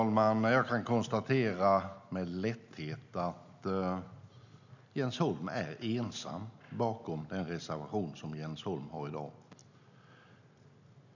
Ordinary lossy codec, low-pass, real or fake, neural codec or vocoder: none; 7.2 kHz; real; none